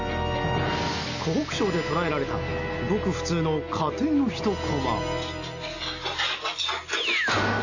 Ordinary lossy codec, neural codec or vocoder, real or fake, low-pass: none; none; real; 7.2 kHz